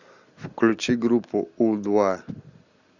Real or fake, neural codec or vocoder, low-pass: real; none; 7.2 kHz